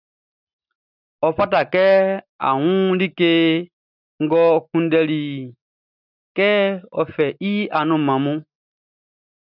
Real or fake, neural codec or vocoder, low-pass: real; none; 5.4 kHz